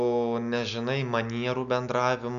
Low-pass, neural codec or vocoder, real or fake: 7.2 kHz; none; real